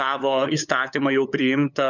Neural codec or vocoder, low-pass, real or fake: codec, 16 kHz, 8 kbps, FunCodec, trained on LibriTTS, 25 frames a second; 7.2 kHz; fake